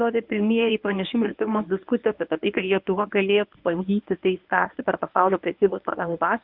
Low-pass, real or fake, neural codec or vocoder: 5.4 kHz; fake; codec, 24 kHz, 0.9 kbps, WavTokenizer, medium speech release version 1